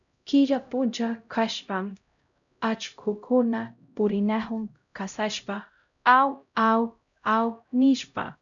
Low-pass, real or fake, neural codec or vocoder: 7.2 kHz; fake; codec, 16 kHz, 0.5 kbps, X-Codec, HuBERT features, trained on LibriSpeech